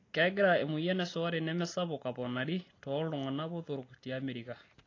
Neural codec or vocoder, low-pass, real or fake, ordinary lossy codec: none; 7.2 kHz; real; AAC, 32 kbps